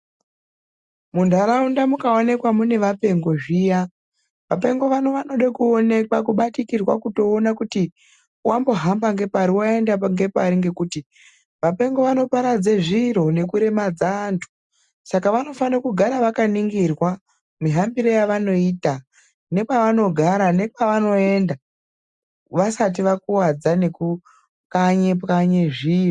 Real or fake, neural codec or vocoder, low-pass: real; none; 10.8 kHz